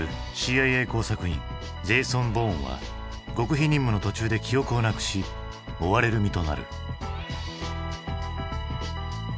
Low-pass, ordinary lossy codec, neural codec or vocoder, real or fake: none; none; none; real